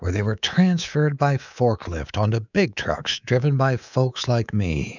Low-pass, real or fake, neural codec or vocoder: 7.2 kHz; fake; codec, 24 kHz, 3.1 kbps, DualCodec